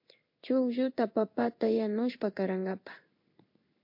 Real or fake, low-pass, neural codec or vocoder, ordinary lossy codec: fake; 5.4 kHz; codec, 16 kHz in and 24 kHz out, 1 kbps, XY-Tokenizer; MP3, 32 kbps